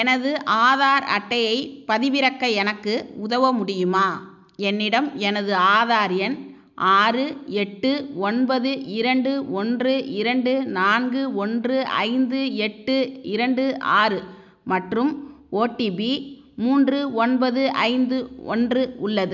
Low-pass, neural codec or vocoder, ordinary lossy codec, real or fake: 7.2 kHz; none; none; real